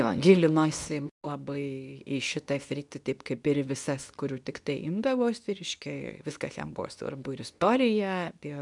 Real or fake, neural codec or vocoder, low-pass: fake; codec, 24 kHz, 0.9 kbps, WavTokenizer, medium speech release version 2; 10.8 kHz